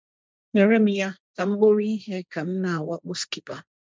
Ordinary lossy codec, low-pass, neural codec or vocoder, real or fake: none; none; codec, 16 kHz, 1.1 kbps, Voila-Tokenizer; fake